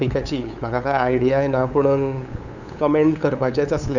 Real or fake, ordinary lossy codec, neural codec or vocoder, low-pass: fake; none; codec, 16 kHz, 8 kbps, FunCodec, trained on LibriTTS, 25 frames a second; 7.2 kHz